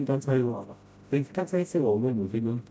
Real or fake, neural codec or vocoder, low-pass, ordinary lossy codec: fake; codec, 16 kHz, 0.5 kbps, FreqCodec, smaller model; none; none